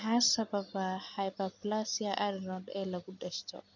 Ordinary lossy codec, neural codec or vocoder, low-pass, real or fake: none; none; 7.2 kHz; real